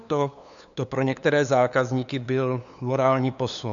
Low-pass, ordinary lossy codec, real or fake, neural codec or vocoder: 7.2 kHz; MP3, 96 kbps; fake; codec, 16 kHz, 2 kbps, FunCodec, trained on LibriTTS, 25 frames a second